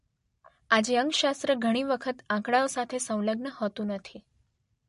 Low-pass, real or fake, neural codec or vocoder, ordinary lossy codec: 10.8 kHz; real; none; MP3, 48 kbps